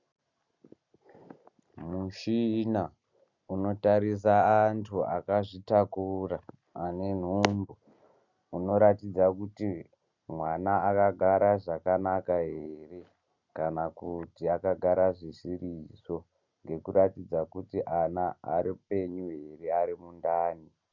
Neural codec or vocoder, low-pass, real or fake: none; 7.2 kHz; real